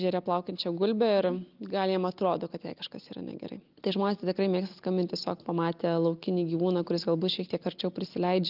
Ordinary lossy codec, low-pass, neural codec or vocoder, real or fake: Opus, 24 kbps; 5.4 kHz; none; real